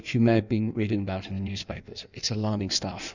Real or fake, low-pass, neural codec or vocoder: fake; 7.2 kHz; codec, 16 kHz in and 24 kHz out, 1.1 kbps, FireRedTTS-2 codec